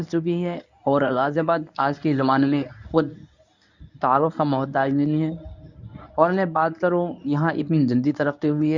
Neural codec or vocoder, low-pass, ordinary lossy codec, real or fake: codec, 24 kHz, 0.9 kbps, WavTokenizer, medium speech release version 1; 7.2 kHz; none; fake